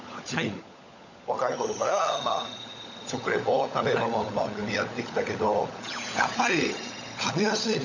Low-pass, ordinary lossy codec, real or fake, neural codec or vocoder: 7.2 kHz; none; fake; codec, 16 kHz, 16 kbps, FunCodec, trained on LibriTTS, 50 frames a second